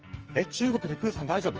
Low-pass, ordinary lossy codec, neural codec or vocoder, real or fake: 7.2 kHz; Opus, 24 kbps; codec, 44.1 kHz, 2.6 kbps, SNAC; fake